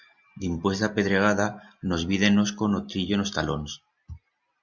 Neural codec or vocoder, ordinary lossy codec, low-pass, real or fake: none; Opus, 64 kbps; 7.2 kHz; real